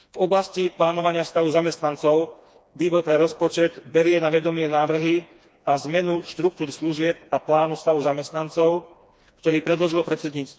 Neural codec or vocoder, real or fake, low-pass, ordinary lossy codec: codec, 16 kHz, 2 kbps, FreqCodec, smaller model; fake; none; none